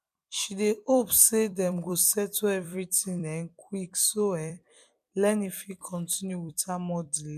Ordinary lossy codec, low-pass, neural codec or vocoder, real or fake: none; 14.4 kHz; vocoder, 44.1 kHz, 128 mel bands every 256 samples, BigVGAN v2; fake